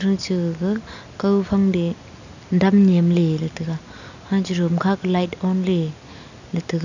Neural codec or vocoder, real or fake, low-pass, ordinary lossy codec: none; real; 7.2 kHz; none